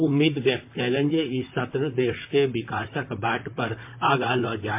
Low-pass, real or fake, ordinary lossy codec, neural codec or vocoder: 3.6 kHz; fake; MP3, 32 kbps; vocoder, 44.1 kHz, 128 mel bands, Pupu-Vocoder